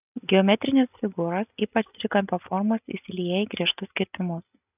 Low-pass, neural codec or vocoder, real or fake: 3.6 kHz; none; real